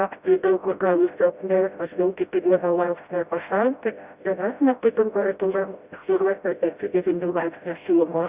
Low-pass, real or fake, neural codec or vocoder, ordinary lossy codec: 3.6 kHz; fake; codec, 16 kHz, 0.5 kbps, FreqCodec, smaller model; Opus, 64 kbps